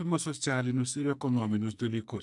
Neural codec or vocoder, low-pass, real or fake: codec, 44.1 kHz, 2.6 kbps, SNAC; 10.8 kHz; fake